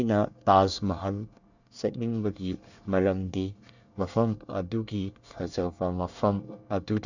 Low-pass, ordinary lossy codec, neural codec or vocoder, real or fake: 7.2 kHz; none; codec, 24 kHz, 1 kbps, SNAC; fake